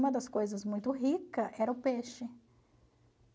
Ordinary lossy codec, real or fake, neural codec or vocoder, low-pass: none; real; none; none